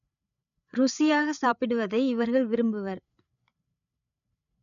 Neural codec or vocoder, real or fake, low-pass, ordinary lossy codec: codec, 16 kHz, 8 kbps, FreqCodec, larger model; fake; 7.2 kHz; none